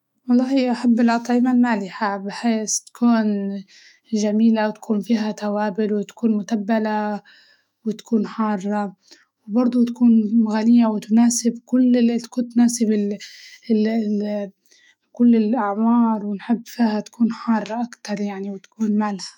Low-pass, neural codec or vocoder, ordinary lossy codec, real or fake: 19.8 kHz; autoencoder, 48 kHz, 128 numbers a frame, DAC-VAE, trained on Japanese speech; none; fake